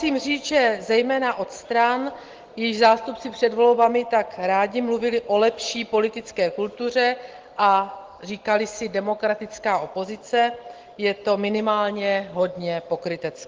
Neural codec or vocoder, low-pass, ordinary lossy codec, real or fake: none; 7.2 kHz; Opus, 16 kbps; real